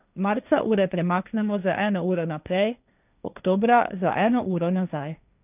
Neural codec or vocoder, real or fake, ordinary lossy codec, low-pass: codec, 16 kHz, 1.1 kbps, Voila-Tokenizer; fake; none; 3.6 kHz